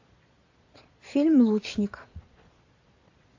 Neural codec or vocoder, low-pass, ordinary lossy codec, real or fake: vocoder, 22.05 kHz, 80 mel bands, Vocos; 7.2 kHz; AAC, 48 kbps; fake